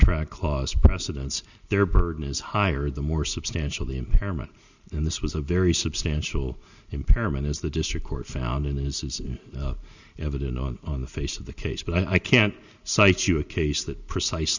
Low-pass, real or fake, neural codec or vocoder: 7.2 kHz; real; none